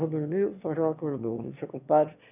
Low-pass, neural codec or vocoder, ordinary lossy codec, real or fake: 3.6 kHz; autoencoder, 22.05 kHz, a latent of 192 numbers a frame, VITS, trained on one speaker; none; fake